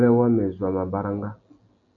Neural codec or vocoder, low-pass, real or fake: none; 7.2 kHz; real